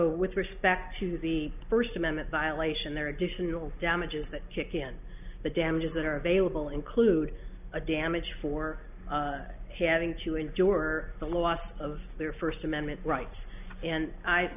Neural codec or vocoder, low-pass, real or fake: none; 3.6 kHz; real